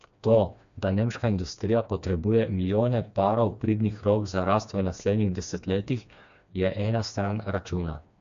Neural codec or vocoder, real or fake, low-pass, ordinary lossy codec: codec, 16 kHz, 2 kbps, FreqCodec, smaller model; fake; 7.2 kHz; MP3, 64 kbps